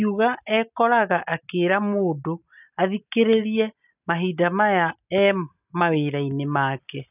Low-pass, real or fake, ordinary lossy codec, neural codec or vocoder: 3.6 kHz; real; none; none